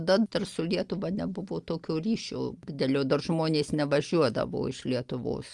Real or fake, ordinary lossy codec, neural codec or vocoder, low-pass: real; Opus, 32 kbps; none; 10.8 kHz